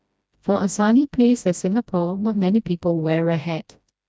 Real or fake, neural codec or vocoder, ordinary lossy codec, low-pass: fake; codec, 16 kHz, 1 kbps, FreqCodec, smaller model; none; none